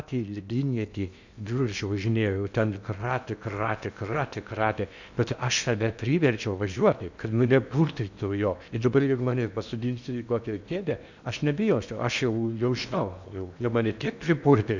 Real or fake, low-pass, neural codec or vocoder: fake; 7.2 kHz; codec, 16 kHz in and 24 kHz out, 0.8 kbps, FocalCodec, streaming, 65536 codes